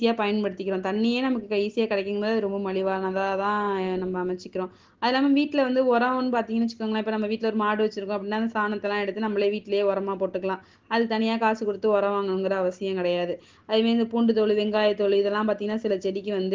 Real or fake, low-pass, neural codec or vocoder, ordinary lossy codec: real; 7.2 kHz; none; Opus, 16 kbps